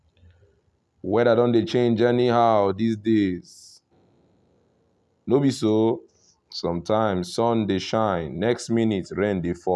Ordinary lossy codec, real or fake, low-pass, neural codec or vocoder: none; real; none; none